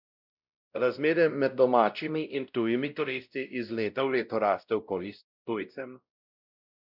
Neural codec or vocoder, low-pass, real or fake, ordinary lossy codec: codec, 16 kHz, 0.5 kbps, X-Codec, WavLM features, trained on Multilingual LibriSpeech; 5.4 kHz; fake; none